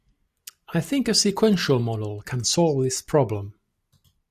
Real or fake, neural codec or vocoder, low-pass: real; none; 14.4 kHz